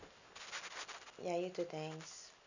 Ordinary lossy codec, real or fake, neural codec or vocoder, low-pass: none; real; none; 7.2 kHz